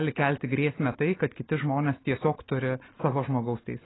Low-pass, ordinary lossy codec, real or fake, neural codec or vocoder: 7.2 kHz; AAC, 16 kbps; real; none